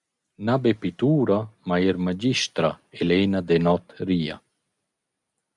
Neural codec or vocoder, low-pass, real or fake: none; 10.8 kHz; real